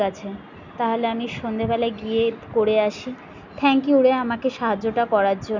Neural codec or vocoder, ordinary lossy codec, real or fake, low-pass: none; none; real; 7.2 kHz